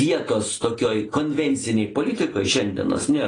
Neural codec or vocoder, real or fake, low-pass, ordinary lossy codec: none; real; 9.9 kHz; AAC, 32 kbps